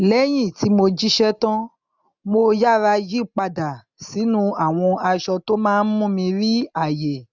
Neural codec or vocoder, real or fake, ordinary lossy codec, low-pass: none; real; none; 7.2 kHz